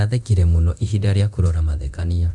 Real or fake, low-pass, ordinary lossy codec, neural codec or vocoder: fake; 10.8 kHz; none; codec, 24 kHz, 0.9 kbps, DualCodec